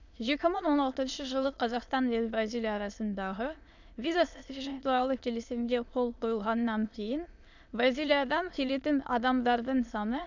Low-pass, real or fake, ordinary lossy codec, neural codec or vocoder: 7.2 kHz; fake; none; autoencoder, 22.05 kHz, a latent of 192 numbers a frame, VITS, trained on many speakers